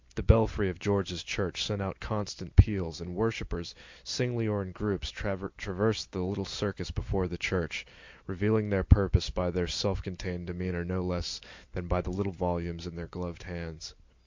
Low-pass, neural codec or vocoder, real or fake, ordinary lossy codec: 7.2 kHz; none; real; MP3, 64 kbps